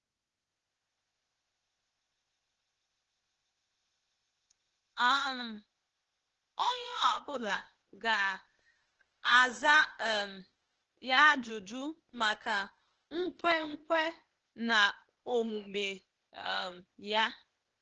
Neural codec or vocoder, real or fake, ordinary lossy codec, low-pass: codec, 16 kHz, 0.8 kbps, ZipCodec; fake; Opus, 16 kbps; 7.2 kHz